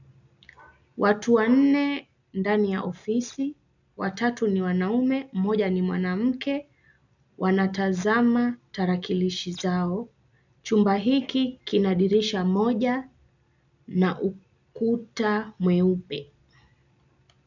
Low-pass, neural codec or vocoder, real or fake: 7.2 kHz; none; real